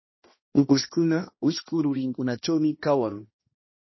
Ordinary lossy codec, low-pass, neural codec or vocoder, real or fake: MP3, 24 kbps; 7.2 kHz; codec, 16 kHz, 1 kbps, X-Codec, HuBERT features, trained on balanced general audio; fake